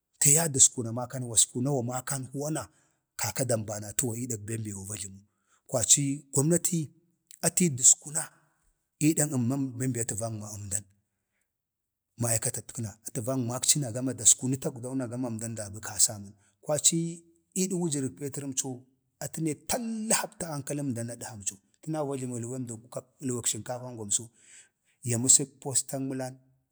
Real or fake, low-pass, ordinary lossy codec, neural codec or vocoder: real; none; none; none